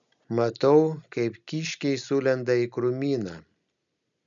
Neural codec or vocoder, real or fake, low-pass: none; real; 7.2 kHz